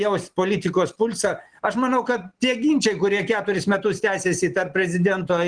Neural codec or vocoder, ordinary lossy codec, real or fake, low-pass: none; Opus, 16 kbps; real; 9.9 kHz